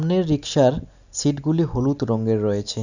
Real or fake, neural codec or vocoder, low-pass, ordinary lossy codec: real; none; 7.2 kHz; none